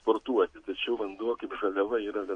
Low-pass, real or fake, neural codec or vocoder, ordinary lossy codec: 9.9 kHz; real; none; AAC, 48 kbps